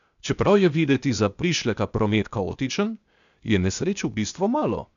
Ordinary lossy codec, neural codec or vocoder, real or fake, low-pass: none; codec, 16 kHz, 0.8 kbps, ZipCodec; fake; 7.2 kHz